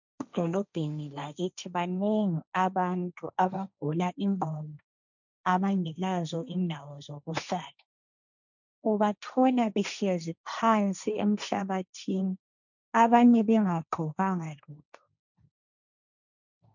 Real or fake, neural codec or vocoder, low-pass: fake; codec, 16 kHz, 1.1 kbps, Voila-Tokenizer; 7.2 kHz